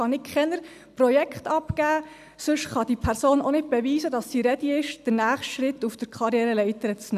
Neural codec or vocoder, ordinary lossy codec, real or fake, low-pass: none; none; real; 14.4 kHz